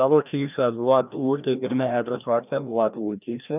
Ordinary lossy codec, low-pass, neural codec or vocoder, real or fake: none; 3.6 kHz; codec, 16 kHz, 1 kbps, FreqCodec, larger model; fake